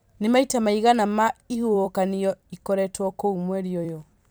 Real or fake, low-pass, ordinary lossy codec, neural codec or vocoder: fake; none; none; vocoder, 44.1 kHz, 128 mel bands every 256 samples, BigVGAN v2